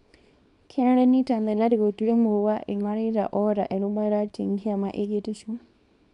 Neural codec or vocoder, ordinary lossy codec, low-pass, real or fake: codec, 24 kHz, 0.9 kbps, WavTokenizer, small release; none; 10.8 kHz; fake